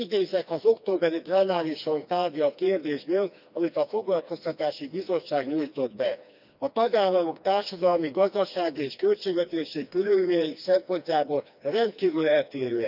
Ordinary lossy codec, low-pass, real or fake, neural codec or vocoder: none; 5.4 kHz; fake; codec, 16 kHz, 2 kbps, FreqCodec, smaller model